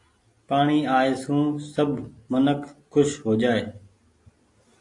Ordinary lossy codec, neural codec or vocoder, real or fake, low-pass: AAC, 48 kbps; none; real; 10.8 kHz